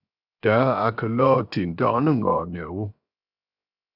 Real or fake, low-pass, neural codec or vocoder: fake; 5.4 kHz; codec, 16 kHz, 0.7 kbps, FocalCodec